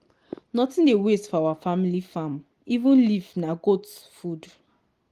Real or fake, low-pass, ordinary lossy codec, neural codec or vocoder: real; 14.4 kHz; Opus, 24 kbps; none